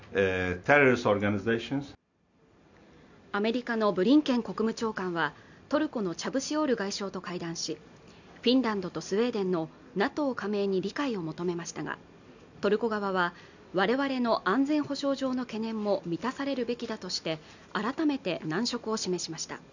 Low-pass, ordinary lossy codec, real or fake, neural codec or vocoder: 7.2 kHz; none; real; none